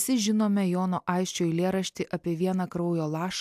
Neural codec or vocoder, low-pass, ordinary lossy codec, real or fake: none; 14.4 kHz; AAC, 96 kbps; real